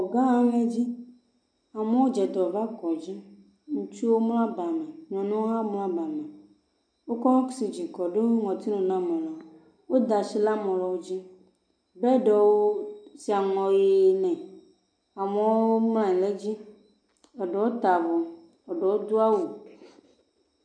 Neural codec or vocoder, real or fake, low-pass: none; real; 9.9 kHz